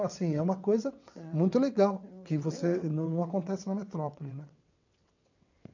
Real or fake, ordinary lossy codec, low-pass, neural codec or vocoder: fake; none; 7.2 kHz; vocoder, 22.05 kHz, 80 mel bands, WaveNeXt